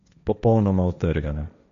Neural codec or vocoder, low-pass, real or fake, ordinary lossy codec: codec, 16 kHz, 1.1 kbps, Voila-Tokenizer; 7.2 kHz; fake; none